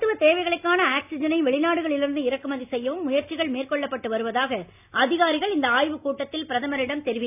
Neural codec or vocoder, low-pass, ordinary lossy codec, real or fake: none; 3.6 kHz; none; real